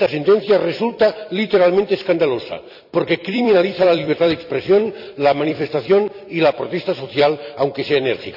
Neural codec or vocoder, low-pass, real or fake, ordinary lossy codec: vocoder, 44.1 kHz, 128 mel bands every 256 samples, BigVGAN v2; 5.4 kHz; fake; none